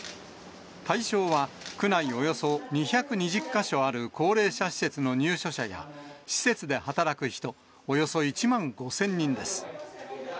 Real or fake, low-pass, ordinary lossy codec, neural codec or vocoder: real; none; none; none